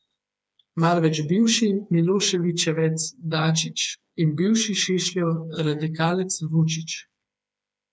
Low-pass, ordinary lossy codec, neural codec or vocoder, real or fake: none; none; codec, 16 kHz, 4 kbps, FreqCodec, smaller model; fake